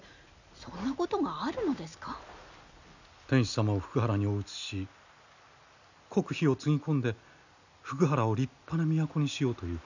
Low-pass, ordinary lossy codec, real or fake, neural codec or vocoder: 7.2 kHz; none; real; none